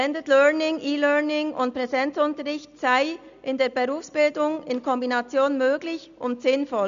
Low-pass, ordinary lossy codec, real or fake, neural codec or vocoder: 7.2 kHz; none; real; none